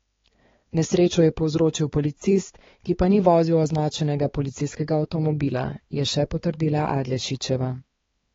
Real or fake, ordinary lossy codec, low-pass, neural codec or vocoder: fake; AAC, 24 kbps; 7.2 kHz; codec, 16 kHz, 4 kbps, X-Codec, HuBERT features, trained on balanced general audio